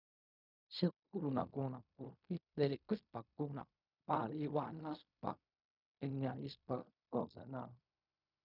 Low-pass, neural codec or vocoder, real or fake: 5.4 kHz; codec, 16 kHz in and 24 kHz out, 0.4 kbps, LongCat-Audio-Codec, fine tuned four codebook decoder; fake